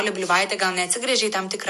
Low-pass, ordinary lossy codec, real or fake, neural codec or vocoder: 14.4 kHz; MP3, 64 kbps; real; none